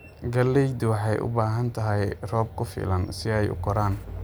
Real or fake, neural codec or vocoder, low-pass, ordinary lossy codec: real; none; none; none